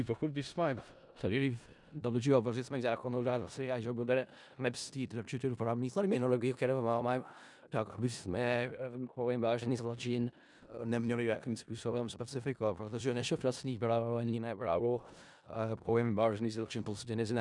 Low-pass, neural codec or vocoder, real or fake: 10.8 kHz; codec, 16 kHz in and 24 kHz out, 0.4 kbps, LongCat-Audio-Codec, four codebook decoder; fake